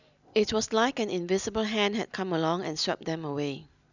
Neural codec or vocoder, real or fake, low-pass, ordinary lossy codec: none; real; 7.2 kHz; none